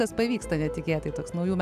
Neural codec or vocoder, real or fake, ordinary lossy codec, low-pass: none; real; AAC, 96 kbps; 14.4 kHz